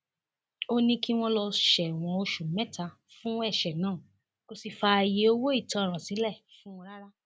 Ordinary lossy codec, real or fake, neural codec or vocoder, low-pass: none; real; none; none